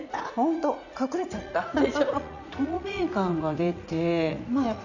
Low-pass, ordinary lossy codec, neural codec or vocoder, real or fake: 7.2 kHz; none; vocoder, 44.1 kHz, 80 mel bands, Vocos; fake